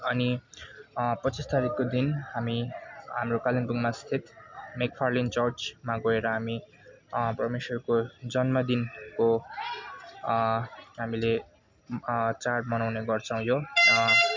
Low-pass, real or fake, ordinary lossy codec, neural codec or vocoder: 7.2 kHz; real; MP3, 64 kbps; none